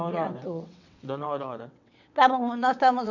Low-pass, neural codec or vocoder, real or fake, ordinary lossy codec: 7.2 kHz; vocoder, 22.05 kHz, 80 mel bands, WaveNeXt; fake; none